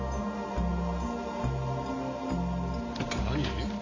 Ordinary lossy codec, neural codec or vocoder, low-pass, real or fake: AAC, 32 kbps; none; 7.2 kHz; real